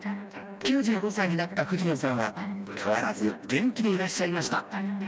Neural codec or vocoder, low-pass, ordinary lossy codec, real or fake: codec, 16 kHz, 1 kbps, FreqCodec, smaller model; none; none; fake